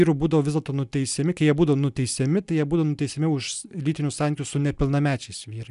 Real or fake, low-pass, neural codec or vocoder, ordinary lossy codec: real; 10.8 kHz; none; MP3, 96 kbps